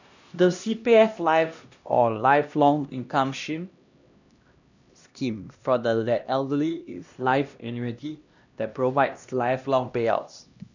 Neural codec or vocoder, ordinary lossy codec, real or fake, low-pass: codec, 16 kHz, 1 kbps, X-Codec, HuBERT features, trained on LibriSpeech; none; fake; 7.2 kHz